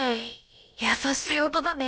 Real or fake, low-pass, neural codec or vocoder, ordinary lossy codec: fake; none; codec, 16 kHz, about 1 kbps, DyCAST, with the encoder's durations; none